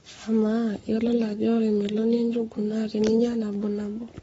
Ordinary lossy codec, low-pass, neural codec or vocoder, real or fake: AAC, 24 kbps; 19.8 kHz; codec, 44.1 kHz, 7.8 kbps, Pupu-Codec; fake